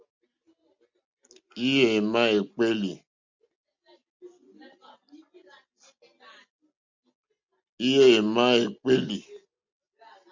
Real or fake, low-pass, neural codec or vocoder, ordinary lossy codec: real; 7.2 kHz; none; MP3, 64 kbps